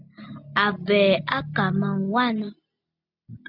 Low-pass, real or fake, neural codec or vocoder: 5.4 kHz; real; none